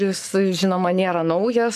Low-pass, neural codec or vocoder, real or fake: 14.4 kHz; codec, 44.1 kHz, 7.8 kbps, Pupu-Codec; fake